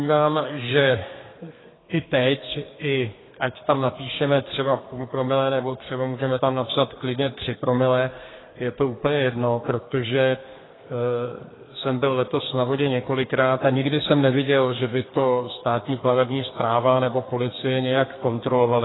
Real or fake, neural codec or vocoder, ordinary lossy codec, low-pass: fake; codec, 32 kHz, 1.9 kbps, SNAC; AAC, 16 kbps; 7.2 kHz